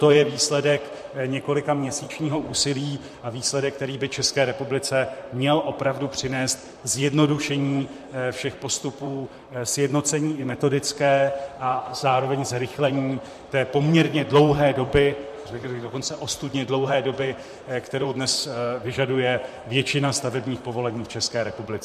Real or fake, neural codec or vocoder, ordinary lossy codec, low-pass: fake; vocoder, 44.1 kHz, 128 mel bands, Pupu-Vocoder; MP3, 64 kbps; 14.4 kHz